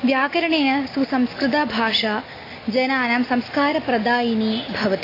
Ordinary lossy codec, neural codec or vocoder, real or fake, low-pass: AAC, 24 kbps; none; real; 5.4 kHz